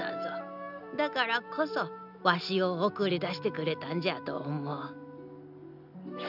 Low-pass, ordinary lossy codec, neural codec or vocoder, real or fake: 5.4 kHz; none; none; real